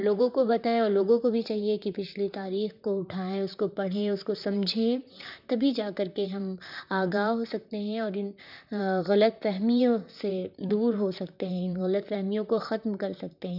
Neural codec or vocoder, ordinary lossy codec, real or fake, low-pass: vocoder, 44.1 kHz, 128 mel bands, Pupu-Vocoder; none; fake; 5.4 kHz